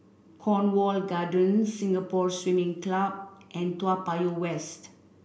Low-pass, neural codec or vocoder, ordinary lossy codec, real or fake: none; none; none; real